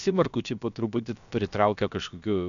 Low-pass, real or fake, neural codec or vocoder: 7.2 kHz; fake; codec, 16 kHz, about 1 kbps, DyCAST, with the encoder's durations